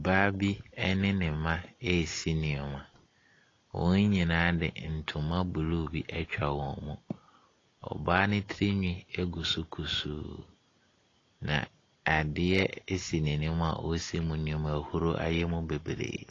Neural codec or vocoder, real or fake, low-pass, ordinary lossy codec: none; real; 7.2 kHz; AAC, 32 kbps